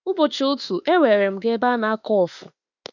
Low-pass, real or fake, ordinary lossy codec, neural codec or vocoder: 7.2 kHz; fake; none; autoencoder, 48 kHz, 32 numbers a frame, DAC-VAE, trained on Japanese speech